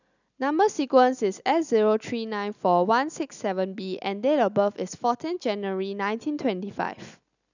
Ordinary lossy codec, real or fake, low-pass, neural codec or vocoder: none; real; 7.2 kHz; none